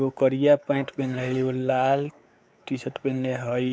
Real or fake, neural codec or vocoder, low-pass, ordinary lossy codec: fake; codec, 16 kHz, 4 kbps, X-Codec, WavLM features, trained on Multilingual LibriSpeech; none; none